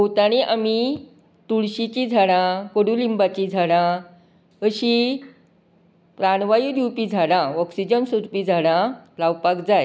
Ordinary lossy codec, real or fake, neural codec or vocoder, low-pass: none; real; none; none